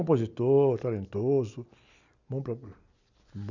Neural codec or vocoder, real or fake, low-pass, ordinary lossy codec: none; real; 7.2 kHz; none